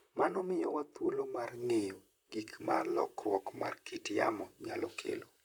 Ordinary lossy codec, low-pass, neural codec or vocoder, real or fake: none; none; vocoder, 44.1 kHz, 128 mel bands, Pupu-Vocoder; fake